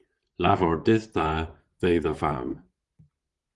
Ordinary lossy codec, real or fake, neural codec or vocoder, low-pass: Opus, 24 kbps; fake; vocoder, 44.1 kHz, 128 mel bands, Pupu-Vocoder; 10.8 kHz